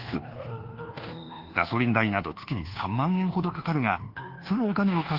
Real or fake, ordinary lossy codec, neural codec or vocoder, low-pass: fake; Opus, 16 kbps; codec, 24 kHz, 1.2 kbps, DualCodec; 5.4 kHz